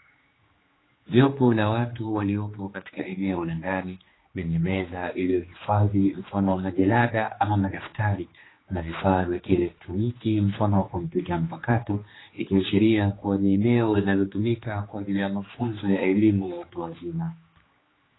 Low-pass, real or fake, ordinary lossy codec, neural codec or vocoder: 7.2 kHz; fake; AAC, 16 kbps; codec, 16 kHz, 2 kbps, X-Codec, HuBERT features, trained on general audio